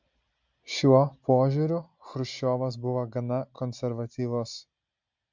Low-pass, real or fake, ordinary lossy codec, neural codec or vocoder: 7.2 kHz; real; MP3, 64 kbps; none